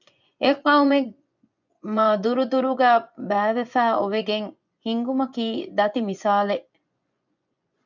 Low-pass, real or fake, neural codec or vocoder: 7.2 kHz; fake; vocoder, 24 kHz, 100 mel bands, Vocos